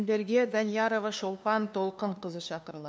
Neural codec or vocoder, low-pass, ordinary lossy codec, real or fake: codec, 16 kHz, 2 kbps, FunCodec, trained on LibriTTS, 25 frames a second; none; none; fake